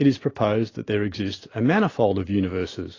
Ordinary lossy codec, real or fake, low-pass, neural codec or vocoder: AAC, 32 kbps; real; 7.2 kHz; none